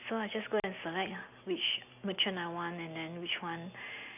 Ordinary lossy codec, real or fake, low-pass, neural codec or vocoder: none; real; 3.6 kHz; none